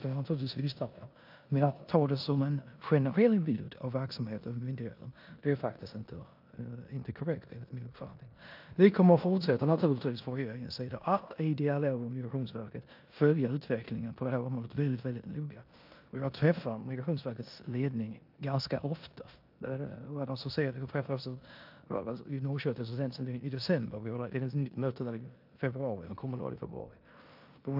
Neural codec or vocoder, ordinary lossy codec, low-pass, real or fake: codec, 16 kHz in and 24 kHz out, 0.9 kbps, LongCat-Audio-Codec, four codebook decoder; AAC, 48 kbps; 5.4 kHz; fake